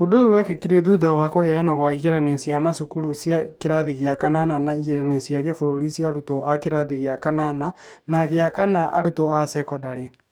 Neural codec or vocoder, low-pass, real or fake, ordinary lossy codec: codec, 44.1 kHz, 2.6 kbps, DAC; none; fake; none